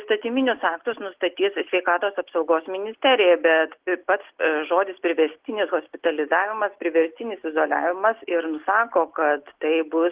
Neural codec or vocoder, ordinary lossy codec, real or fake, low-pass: none; Opus, 16 kbps; real; 3.6 kHz